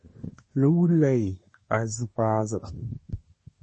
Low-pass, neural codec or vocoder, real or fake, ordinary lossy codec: 10.8 kHz; codec, 24 kHz, 1 kbps, SNAC; fake; MP3, 32 kbps